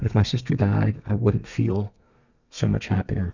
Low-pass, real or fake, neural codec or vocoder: 7.2 kHz; fake; codec, 32 kHz, 1.9 kbps, SNAC